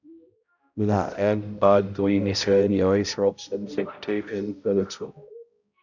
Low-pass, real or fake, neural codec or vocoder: 7.2 kHz; fake; codec, 16 kHz, 0.5 kbps, X-Codec, HuBERT features, trained on balanced general audio